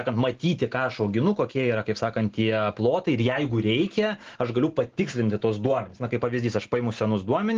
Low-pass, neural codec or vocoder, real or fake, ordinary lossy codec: 7.2 kHz; none; real; Opus, 16 kbps